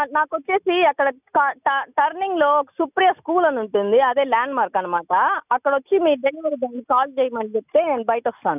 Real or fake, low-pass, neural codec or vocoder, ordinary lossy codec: real; 3.6 kHz; none; none